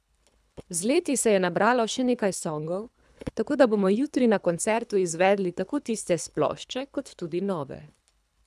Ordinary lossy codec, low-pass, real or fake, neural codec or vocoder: none; none; fake; codec, 24 kHz, 3 kbps, HILCodec